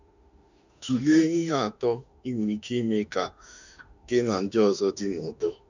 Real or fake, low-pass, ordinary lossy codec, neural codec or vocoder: fake; 7.2 kHz; none; autoencoder, 48 kHz, 32 numbers a frame, DAC-VAE, trained on Japanese speech